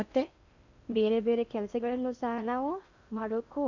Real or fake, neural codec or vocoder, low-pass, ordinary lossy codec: fake; codec, 16 kHz in and 24 kHz out, 0.6 kbps, FocalCodec, streaming, 4096 codes; 7.2 kHz; none